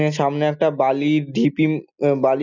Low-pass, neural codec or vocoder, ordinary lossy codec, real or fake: 7.2 kHz; none; none; real